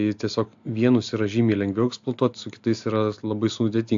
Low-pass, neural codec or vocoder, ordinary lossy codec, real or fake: 7.2 kHz; none; AAC, 64 kbps; real